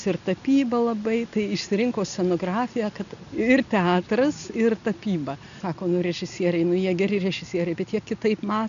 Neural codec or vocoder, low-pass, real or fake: none; 7.2 kHz; real